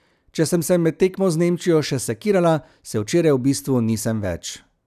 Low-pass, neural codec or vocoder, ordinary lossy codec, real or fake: 14.4 kHz; none; none; real